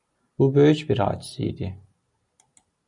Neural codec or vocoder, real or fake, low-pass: none; real; 10.8 kHz